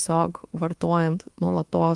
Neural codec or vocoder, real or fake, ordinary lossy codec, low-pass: codec, 24 kHz, 1.2 kbps, DualCodec; fake; Opus, 24 kbps; 10.8 kHz